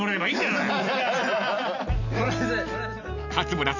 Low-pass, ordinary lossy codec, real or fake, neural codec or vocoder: 7.2 kHz; none; real; none